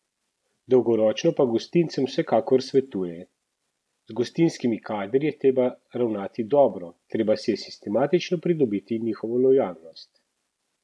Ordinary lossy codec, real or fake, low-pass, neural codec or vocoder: none; real; none; none